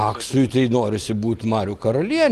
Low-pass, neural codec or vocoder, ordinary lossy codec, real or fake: 14.4 kHz; none; Opus, 24 kbps; real